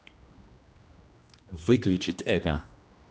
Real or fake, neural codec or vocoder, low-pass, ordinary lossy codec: fake; codec, 16 kHz, 1 kbps, X-Codec, HuBERT features, trained on general audio; none; none